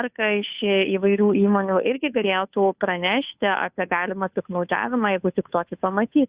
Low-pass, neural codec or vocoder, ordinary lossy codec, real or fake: 3.6 kHz; codec, 16 kHz, 2 kbps, FunCodec, trained on Chinese and English, 25 frames a second; Opus, 64 kbps; fake